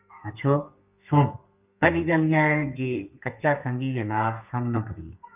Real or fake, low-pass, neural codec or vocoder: fake; 3.6 kHz; codec, 32 kHz, 1.9 kbps, SNAC